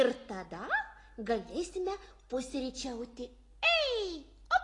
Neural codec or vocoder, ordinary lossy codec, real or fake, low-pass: none; AAC, 32 kbps; real; 10.8 kHz